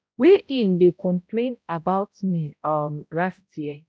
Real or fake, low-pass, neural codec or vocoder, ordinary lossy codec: fake; none; codec, 16 kHz, 0.5 kbps, X-Codec, HuBERT features, trained on balanced general audio; none